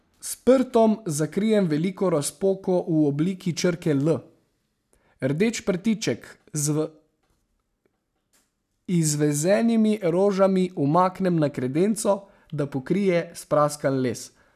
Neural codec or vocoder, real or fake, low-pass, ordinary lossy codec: none; real; 14.4 kHz; none